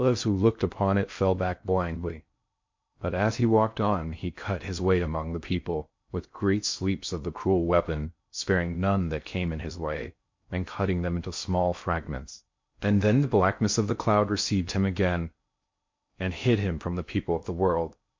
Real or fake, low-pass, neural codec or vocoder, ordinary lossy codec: fake; 7.2 kHz; codec, 16 kHz in and 24 kHz out, 0.6 kbps, FocalCodec, streaming, 2048 codes; MP3, 48 kbps